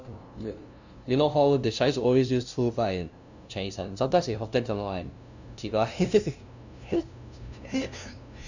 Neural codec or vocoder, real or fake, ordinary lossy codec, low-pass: codec, 16 kHz, 0.5 kbps, FunCodec, trained on LibriTTS, 25 frames a second; fake; none; 7.2 kHz